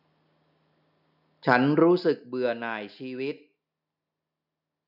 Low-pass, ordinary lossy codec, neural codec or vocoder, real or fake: 5.4 kHz; none; none; real